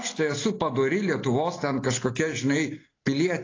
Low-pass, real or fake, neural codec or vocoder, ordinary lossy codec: 7.2 kHz; real; none; AAC, 32 kbps